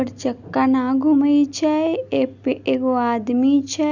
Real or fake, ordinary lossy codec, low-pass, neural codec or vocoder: real; none; 7.2 kHz; none